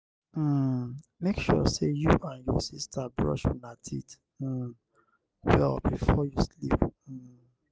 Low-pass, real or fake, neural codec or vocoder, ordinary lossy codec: none; real; none; none